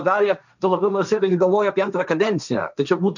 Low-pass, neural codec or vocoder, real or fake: 7.2 kHz; codec, 16 kHz, 1.1 kbps, Voila-Tokenizer; fake